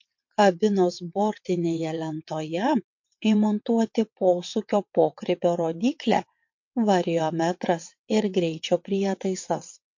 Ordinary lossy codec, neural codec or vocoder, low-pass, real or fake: MP3, 48 kbps; vocoder, 22.05 kHz, 80 mel bands, WaveNeXt; 7.2 kHz; fake